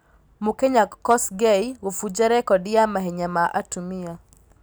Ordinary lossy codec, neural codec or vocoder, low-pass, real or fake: none; none; none; real